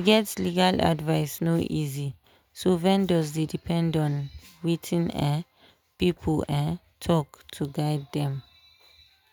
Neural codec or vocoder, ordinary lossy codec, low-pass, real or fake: none; none; none; real